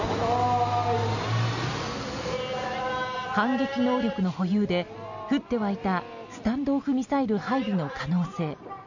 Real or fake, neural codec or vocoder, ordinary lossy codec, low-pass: real; none; none; 7.2 kHz